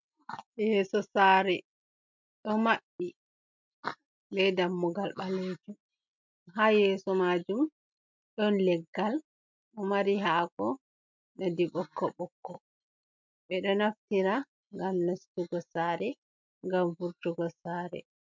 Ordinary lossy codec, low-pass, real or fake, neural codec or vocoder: MP3, 64 kbps; 7.2 kHz; real; none